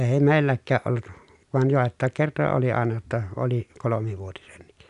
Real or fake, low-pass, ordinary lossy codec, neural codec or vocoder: real; 10.8 kHz; none; none